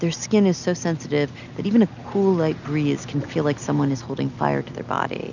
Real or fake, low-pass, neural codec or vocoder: real; 7.2 kHz; none